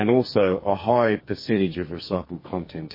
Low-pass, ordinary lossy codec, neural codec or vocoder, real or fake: 5.4 kHz; MP3, 24 kbps; codec, 44.1 kHz, 2.6 kbps, SNAC; fake